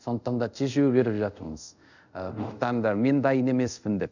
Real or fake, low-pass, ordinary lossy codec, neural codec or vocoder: fake; 7.2 kHz; none; codec, 24 kHz, 0.5 kbps, DualCodec